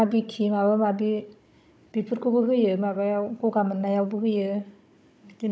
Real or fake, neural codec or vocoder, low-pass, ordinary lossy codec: fake; codec, 16 kHz, 16 kbps, FunCodec, trained on Chinese and English, 50 frames a second; none; none